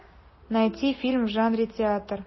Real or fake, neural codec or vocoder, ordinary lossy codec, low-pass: real; none; MP3, 24 kbps; 7.2 kHz